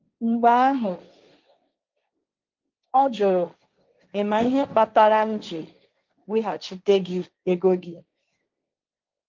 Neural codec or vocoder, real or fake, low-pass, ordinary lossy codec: codec, 16 kHz, 1.1 kbps, Voila-Tokenizer; fake; 7.2 kHz; Opus, 24 kbps